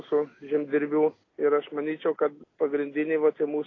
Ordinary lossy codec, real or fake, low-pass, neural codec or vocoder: AAC, 32 kbps; real; 7.2 kHz; none